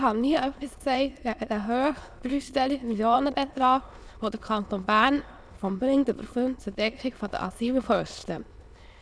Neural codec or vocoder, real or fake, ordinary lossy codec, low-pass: autoencoder, 22.05 kHz, a latent of 192 numbers a frame, VITS, trained on many speakers; fake; none; none